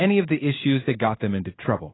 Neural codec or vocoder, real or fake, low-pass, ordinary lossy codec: codec, 16 kHz in and 24 kHz out, 1 kbps, XY-Tokenizer; fake; 7.2 kHz; AAC, 16 kbps